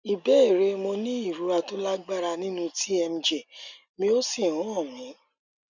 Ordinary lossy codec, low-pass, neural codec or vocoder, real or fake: none; 7.2 kHz; none; real